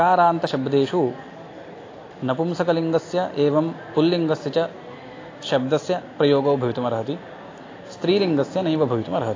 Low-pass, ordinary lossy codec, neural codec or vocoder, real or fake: 7.2 kHz; AAC, 32 kbps; none; real